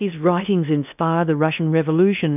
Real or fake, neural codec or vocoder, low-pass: fake; codec, 16 kHz in and 24 kHz out, 0.6 kbps, FocalCodec, streaming, 2048 codes; 3.6 kHz